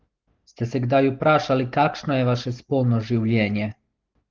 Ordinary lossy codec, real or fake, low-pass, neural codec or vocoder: Opus, 16 kbps; real; 7.2 kHz; none